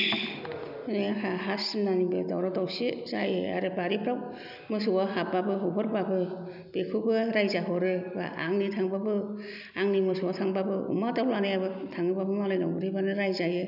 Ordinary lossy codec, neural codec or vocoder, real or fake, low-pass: none; none; real; 5.4 kHz